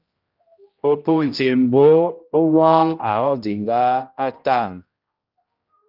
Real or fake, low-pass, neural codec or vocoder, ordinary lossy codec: fake; 5.4 kHz; codec, 16 kHz, 0.5 kbps, X-Codec, HuBERT features, trained on general audio; Opus, 24 kbps